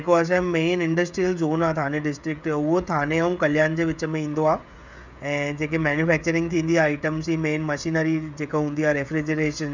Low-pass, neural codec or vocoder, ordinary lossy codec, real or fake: 7.2 kHz; codec, 16 kHz, 16 kbps, FreqCodec, smaller model; none; fake